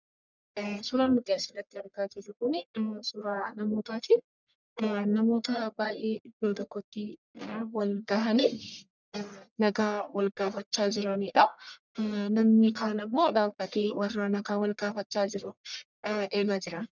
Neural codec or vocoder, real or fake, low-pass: codec, 44.1 kHz, 1.7 kbps, Pupu-Codec; fake; 7.2 kHz